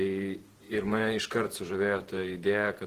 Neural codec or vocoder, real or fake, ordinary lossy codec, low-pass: vocoder, 48 kHz, 128 mel bands, Vocos; fake; Opus, 16 kbps; 14.4 kHz